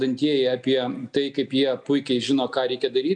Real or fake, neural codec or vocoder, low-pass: real; none; 9.9 kHz